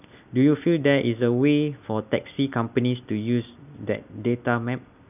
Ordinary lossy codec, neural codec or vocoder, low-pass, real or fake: none; none; 3.6 kHz; real